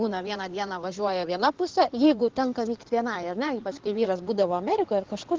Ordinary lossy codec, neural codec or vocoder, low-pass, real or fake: Opus, 16 kbps; codec, 16 kHz in and 24 kHz out, 2.2 kbps, FireRedTTS-2 codec; 7.2 kHz; fake